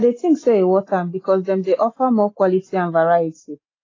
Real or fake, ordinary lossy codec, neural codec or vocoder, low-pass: real; AAC, 32 kbps; none; 7.2 kHz